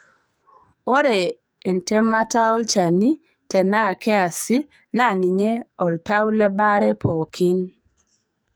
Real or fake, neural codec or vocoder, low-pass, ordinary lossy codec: fake; codec, 44.1 kHz, 2.6 kbps, SNAC; none; none